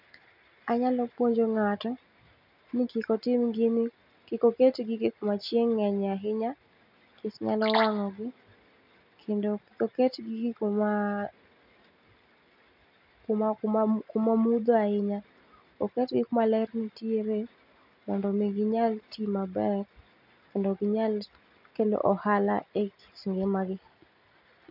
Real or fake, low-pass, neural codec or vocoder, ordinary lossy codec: real; 5.4 kHz; none; none